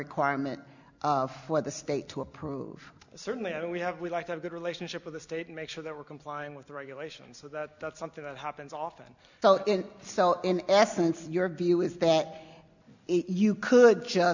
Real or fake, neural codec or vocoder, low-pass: real; none; 7.2 kHz